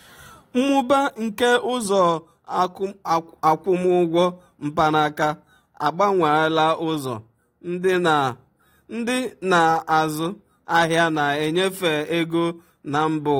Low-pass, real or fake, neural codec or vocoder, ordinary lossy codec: 19.8 kHz; real; none; AAC, 48 kbps